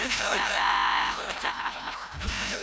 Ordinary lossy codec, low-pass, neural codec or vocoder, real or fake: none; none; codec, 16 kHz, 0.5 kbps, FreqCodec, larger model; fake